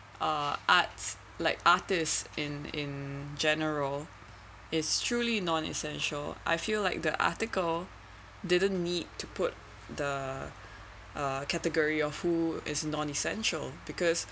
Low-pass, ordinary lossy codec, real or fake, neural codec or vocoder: none; none; real; none